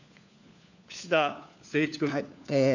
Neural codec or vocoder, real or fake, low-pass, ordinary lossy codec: codec, 16 kHz, 4 kbps, FunCodec, trained on LibriTTS, 50 frames a second; fake; 7.2 kHz; none